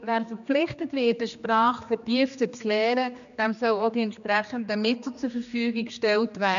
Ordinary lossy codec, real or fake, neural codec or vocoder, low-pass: none; fake; codec, 16 kHz, 2 kbps, X-Codec, HuBERT features, trained on general audio; 7.2 kHz